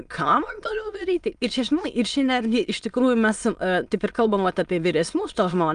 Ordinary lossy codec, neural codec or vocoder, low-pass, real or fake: Opus, 32 kbps; autoencoder, 22.05 kHz, a latent of 192 numbers a frame, VITS, trained on many speakers; 9.9 kHz; fake